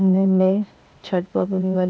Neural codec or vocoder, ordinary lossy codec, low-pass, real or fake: codec, 16 kHz, 0.8 kbps, ZipCodec; none; none; fake